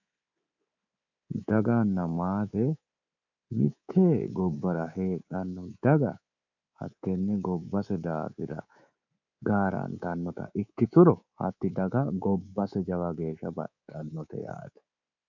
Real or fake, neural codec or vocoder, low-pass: fake; codec, 24 kHz, 3.1 kbps, DualCodec; 7.2 kHz